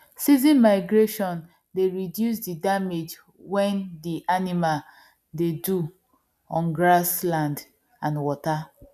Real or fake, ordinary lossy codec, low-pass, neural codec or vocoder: real; none; 14.4 kHz; none